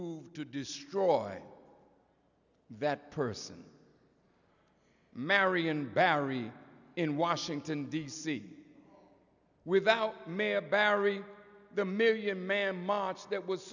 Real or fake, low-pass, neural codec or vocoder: real; 7.2 kHz; none